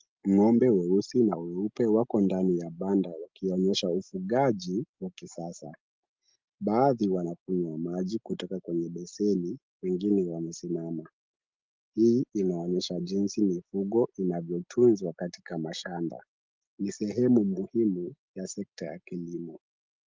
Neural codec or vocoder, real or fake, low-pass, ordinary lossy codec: none; real; 7.2 kHz; Opus, 24 kbps